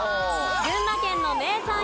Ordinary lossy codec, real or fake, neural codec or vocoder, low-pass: none; real; none; none